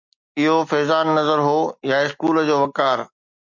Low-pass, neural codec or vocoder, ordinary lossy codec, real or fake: 7.2 kHz; none; MP3, 64 kbps; real